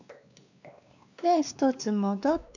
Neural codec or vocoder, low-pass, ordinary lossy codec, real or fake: codec, 16 kHz, 2 kbps, X-Codec, WavLM features, trained on Multilingual LibriSpeech; 7.2 kHz; none; fake